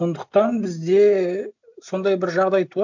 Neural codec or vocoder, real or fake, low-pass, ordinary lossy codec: none; real; 7.2 kHz; none